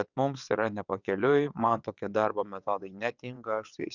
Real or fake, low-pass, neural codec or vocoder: fake; 7.2 kHz; vocoder, 22.05 kHz, 80 mel bands, WaveNeXt